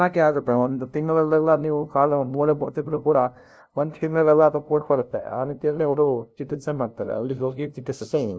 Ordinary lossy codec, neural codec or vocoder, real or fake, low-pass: none; codec, 16 kHz, 0.5 kbps, FunCodec, trained on LibriTTS, 25 frames a second; fake; none